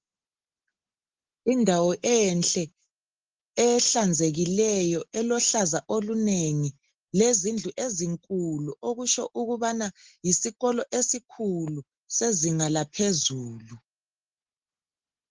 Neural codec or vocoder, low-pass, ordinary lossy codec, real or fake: none; 7.2 kHz; Opus, 16 kbps; real